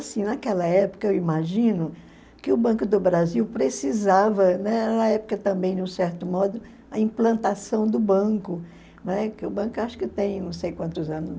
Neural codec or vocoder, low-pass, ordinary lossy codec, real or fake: none; none; none; real